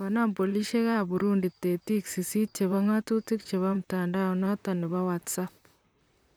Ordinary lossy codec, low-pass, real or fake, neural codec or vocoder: none; none; fake; vocoder, 44.1 kHz, 128 mel bands, Pupu-Vocoder